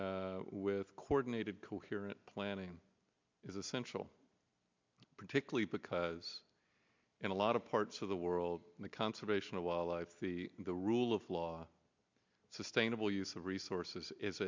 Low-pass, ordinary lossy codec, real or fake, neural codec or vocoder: 7.2 kHz; MP3, 64 kbps; real; none